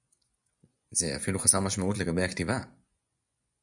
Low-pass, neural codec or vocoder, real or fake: 10.8 kHz; vocoder, 24 kHz, 100 mel bands, Vocos; fake